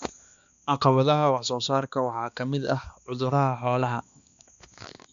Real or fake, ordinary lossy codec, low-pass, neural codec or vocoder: fake; none; 7.2 kHz; codec, 16 kHz, 2 kbps, X-Codec, HuBERT features, trained on balanced general audio